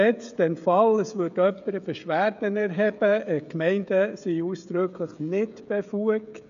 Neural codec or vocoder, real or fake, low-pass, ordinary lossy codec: codec, 16 kHz, 16 kbps, FreqCodec, smaller model; fake; 7.2 kHz; none